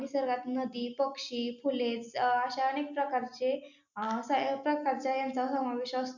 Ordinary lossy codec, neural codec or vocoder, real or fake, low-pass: none; none; real; 7.2 kHz